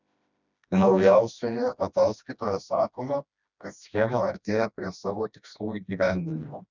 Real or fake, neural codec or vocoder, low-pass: fake; codec, 16 kHz, 1 kbps, FreqCodec, smaller model; 7.2 kHz